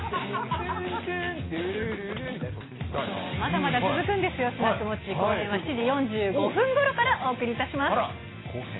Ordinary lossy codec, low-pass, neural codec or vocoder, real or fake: AAC, 16 kbps; 7.2 kHz; none; real